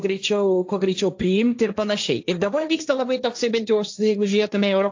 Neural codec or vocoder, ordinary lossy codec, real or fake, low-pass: codec, 16 kHz, 1.1 kbps, Voila-Tokenizer; AAC, 48 kbps; fake; 7.2 kHz